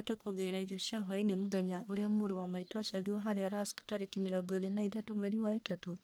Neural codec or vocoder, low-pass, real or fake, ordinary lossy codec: codec, 44.1 kHz, 1.7 kbps, Pupu-Codec; none; fake; none